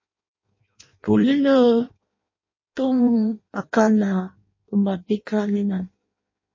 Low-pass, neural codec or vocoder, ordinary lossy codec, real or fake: 7.2 kHz; codec, 16 kHz in and 24 kHz out, 0.6 kbps, FireRedTTS-2 codec; MP3, 32 kbps; fake